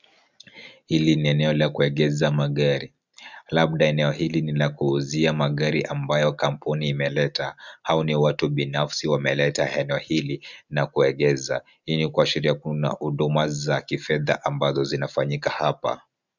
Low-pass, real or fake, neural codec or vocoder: 7.2 kHz; real; none